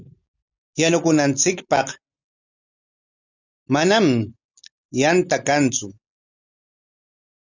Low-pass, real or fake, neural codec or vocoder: 7.2 kHz; real; none